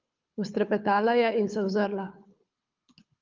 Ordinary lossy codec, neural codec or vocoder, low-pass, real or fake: Opus, 24 kbps; codec, 24 kHz, 6 kbps, HILCodec; 7.2 kHz; fake